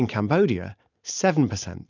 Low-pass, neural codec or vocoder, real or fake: 7.2 kHz; none; real